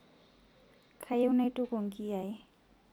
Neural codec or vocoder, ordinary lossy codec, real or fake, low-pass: vocoder, 44.1 kHz, 128 mel bands every 256 samples, BigVGAN v2; none; fake; 19.8 kHz